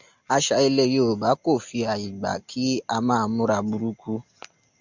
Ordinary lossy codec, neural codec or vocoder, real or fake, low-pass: MP3, 64 kbps; none; real; 7.2 kHz